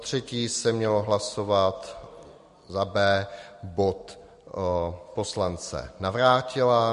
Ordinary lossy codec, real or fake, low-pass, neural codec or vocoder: MP3, 48 kbps; real; 14.4 kHz; none